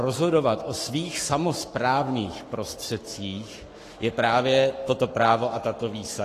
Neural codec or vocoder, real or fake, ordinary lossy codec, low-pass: codec, 44.1 kHz, 7.8 kbps, Pupu-Codec; fake; AAC, 48 kbps; 14.4 kHz